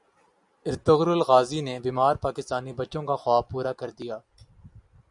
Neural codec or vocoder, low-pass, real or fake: none; 10.8 kHz; real